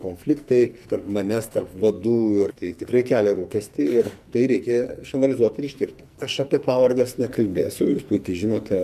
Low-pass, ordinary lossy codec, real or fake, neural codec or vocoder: 14.4 kHz; MP3, 96 kbps; fake; codec, 44.1 kHz, 2.6 kbps, SNAC